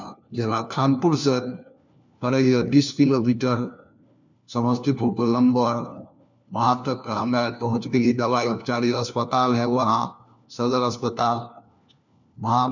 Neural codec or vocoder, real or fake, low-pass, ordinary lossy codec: codec, 16 kHz, 1 kbps, FunCodec, trained on LibriTTS, 50 frames a second; fake; 7.2 kHz; none